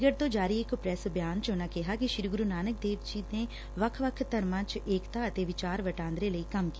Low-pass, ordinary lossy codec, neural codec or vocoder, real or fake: none; none; none; real